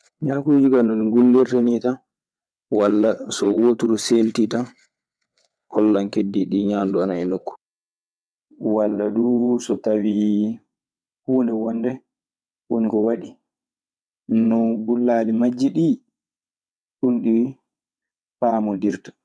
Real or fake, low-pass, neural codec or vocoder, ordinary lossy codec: fake; none; vocoder, 22.05 kHz, 80 mel bands, WaveNeXt; none